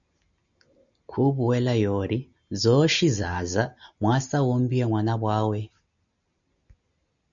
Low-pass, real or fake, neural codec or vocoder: 7.2 kHz; real; none